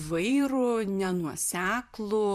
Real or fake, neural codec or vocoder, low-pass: fake; vocoder, 44.1 kHz, 128 mel bands, Pupu-Vocoder; 14.4 kHz